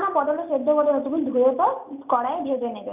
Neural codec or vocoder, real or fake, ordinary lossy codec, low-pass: none; real; none; 3.6 kHz